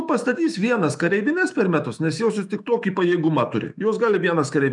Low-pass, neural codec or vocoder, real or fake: 10.8 kHz; autoencoder, 48 kHz, 128 numbers a frame, DAC-VAE, trained on Japanese speech; fake